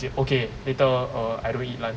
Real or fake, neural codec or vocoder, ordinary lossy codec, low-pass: real; none; none; none